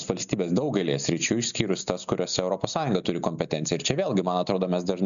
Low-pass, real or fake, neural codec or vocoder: 7.2 kHz; real; none